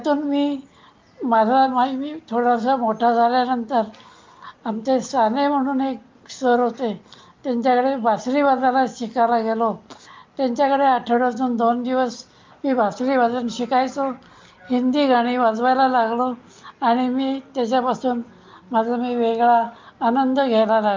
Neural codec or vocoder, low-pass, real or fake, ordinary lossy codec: none; 7.2 kHz; real; Opus, 24 kbps